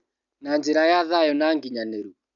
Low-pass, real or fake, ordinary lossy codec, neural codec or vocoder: 7.2 kHz; real; none; none